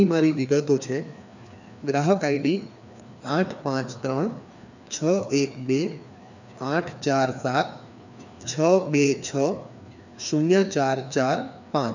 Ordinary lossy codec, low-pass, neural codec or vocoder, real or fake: none; 7.2 kHz; codec, 16 kHz, 2 kbps, FreqCodec, larger model; fake